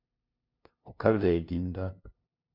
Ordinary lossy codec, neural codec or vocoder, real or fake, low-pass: MP3, 48 kbps; codec, 16 kHz, 0.5 kbps, FunCodec, trained on LibriTTS, 25 frames a second; fake; 5.4 kHz